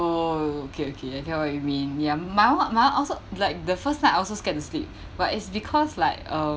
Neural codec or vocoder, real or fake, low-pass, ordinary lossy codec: none; real; none; none